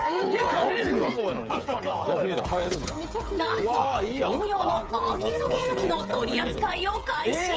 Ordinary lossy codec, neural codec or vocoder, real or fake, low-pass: none; codec, 16 kHz, 8 kbps, FreqCodec, smaller model; fake; none